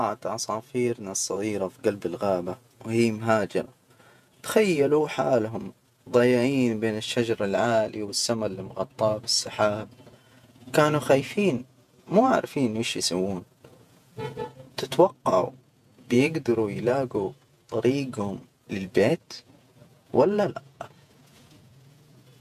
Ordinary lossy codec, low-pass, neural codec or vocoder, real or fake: none; 14.4 kHz; vocoder, 48 kHz, 128 mel bands, Vocos; fake